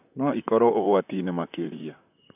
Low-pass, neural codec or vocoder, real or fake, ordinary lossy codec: 3.6 kHz; vocoder, 44.1 kHz, 128 mel bands, Pupu-Vocoder; fake; none